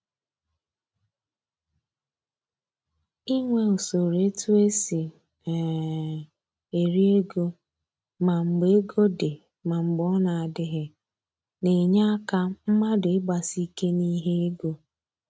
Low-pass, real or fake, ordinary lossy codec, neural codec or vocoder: none; real; none; none